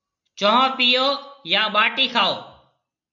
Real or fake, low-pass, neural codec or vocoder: real; 7.2 kHz; none